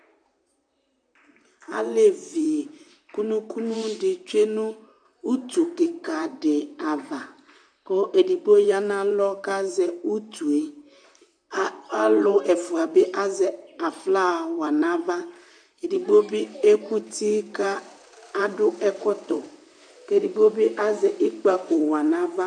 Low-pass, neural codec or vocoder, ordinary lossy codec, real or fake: 9.9 kHz; vocoder, 44.1 kHz, 128 mel bands, Pupu-Vocoder; AAC, 64 kbps; fake